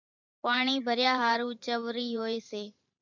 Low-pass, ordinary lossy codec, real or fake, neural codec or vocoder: 7.2 kHz; MP3, 64 kbps; fake; vocoder, 44.1 kHz, 128 mel bands every 512 samples, BigVGAN v2